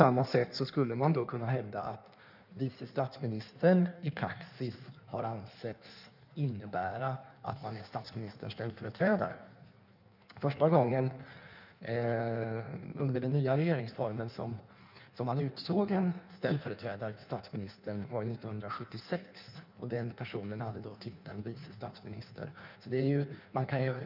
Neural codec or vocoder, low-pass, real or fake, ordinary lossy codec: codec, 16 kHz in and 24 kHz out, 1.1 kbps, FireRedTTS-2 codec; 5.4 kHz; fake; none